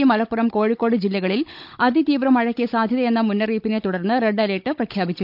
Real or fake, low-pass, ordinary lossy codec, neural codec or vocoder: fake; 5.4 kHz; none; codec, 16 kHz, 16 kbps, FunCodec, trained on Chinese and English, 50 frames a second